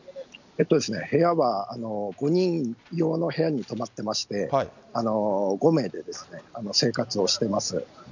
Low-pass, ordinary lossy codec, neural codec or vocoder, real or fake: 7.2 kHz; none; none; real